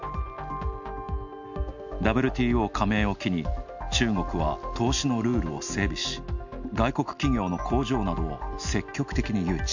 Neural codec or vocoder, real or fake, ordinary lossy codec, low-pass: none; real; none; 7.2 kHz